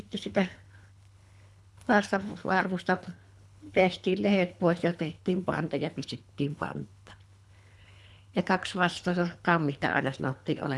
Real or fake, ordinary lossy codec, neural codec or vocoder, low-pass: fake; none; codec, 24 kHz, 3 kbps, HILCodec; none